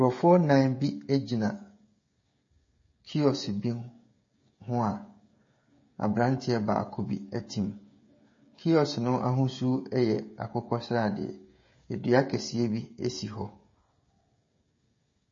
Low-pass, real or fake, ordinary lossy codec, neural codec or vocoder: 7.2 kHz; fake; MP3, 32 kbps; codec, 16 kHz, 16 kbps, FreqCodec, smaller model